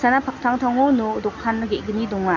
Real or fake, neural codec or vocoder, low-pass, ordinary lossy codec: real; none; 7.2 kHz; none